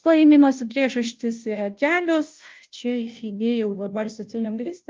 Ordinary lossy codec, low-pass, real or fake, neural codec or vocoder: Opus, 24 kbps; 7.2 kHz; fake; codec, 16 kHz, 0.5 kbps, FunCodec, trained on Chinese and English, 25 frames a second